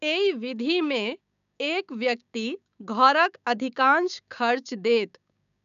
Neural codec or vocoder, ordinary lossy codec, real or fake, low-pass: codec, 16 kHz, 6 kbps, DAC; none; fake; 7.2 kHz